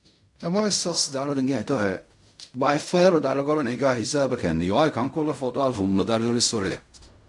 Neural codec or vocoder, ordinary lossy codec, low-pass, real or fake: codec, 16 kHz in and 24 kHz out, 0.4 kbps, LongCat-Audio-Codec, fine tuned four codebook decoder; MP3, 64 kbps; 10.8 kHz; fake